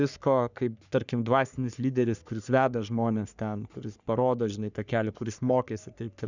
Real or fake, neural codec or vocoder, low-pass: fake; codec, 44.1 kHz, 3.4 kbps, Pupu-Codec; 7.2 kHz